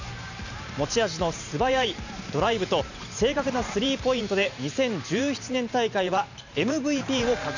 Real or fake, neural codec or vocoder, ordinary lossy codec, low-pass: fake; vocoder, 44.1 kHz, 128 mel bands every 512 samples, BigVGAN v2; none; 7.2 kHz